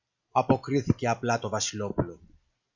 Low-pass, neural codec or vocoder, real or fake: 7.2 kHz; none; real